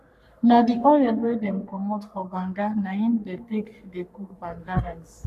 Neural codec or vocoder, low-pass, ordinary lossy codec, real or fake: codec, 44.1 kHz, 3.4 kbps, Pupu-Codec; 14.4 kHz; none; fake